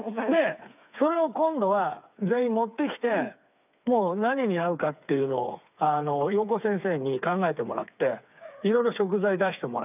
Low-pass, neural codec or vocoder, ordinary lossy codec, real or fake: 3.6 kHz; codec, 16 kHz, 4 kbps, FreqCodec, smaller model; none; fake